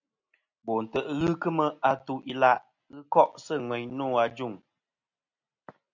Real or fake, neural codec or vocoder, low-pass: real; none; 7.2 kHz